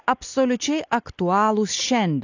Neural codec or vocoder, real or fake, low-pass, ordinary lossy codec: none; real; 7.2 kHz; AAC, 48 kbps